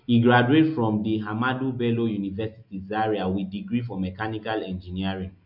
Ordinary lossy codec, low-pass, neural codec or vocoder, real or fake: MP3, 48 kbps; 5.4 kHz; none; real